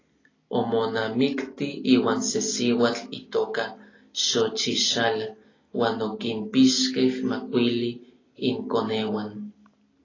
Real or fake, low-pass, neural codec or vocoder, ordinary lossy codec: real; 7.2 kHz; none; AAC, 32 kbps